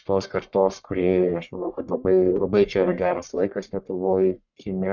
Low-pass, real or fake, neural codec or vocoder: 7.2 kHz; fake; codec, 44.1 kHz, 1.7 kbps, Pupu-Codec